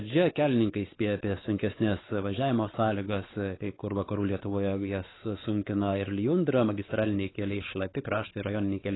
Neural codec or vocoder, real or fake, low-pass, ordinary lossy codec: codec, 16 kHz, 4 kbps, X-Codec, WavLM features, trained on Multilingual LibriSpeech; fake; 7.2 kHz; AAC, 16 kbps